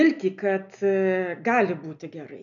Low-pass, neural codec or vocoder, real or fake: 7.2 kHz; none; real